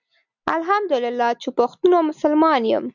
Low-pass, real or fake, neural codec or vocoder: 7.2 kHz; real; none